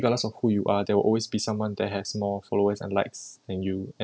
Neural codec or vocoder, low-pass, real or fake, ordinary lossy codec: none; none; real; none